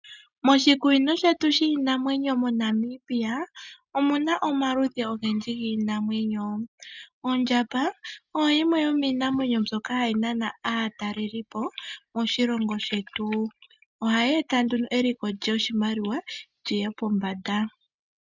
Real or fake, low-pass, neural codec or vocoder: real; 7.2 kHz; none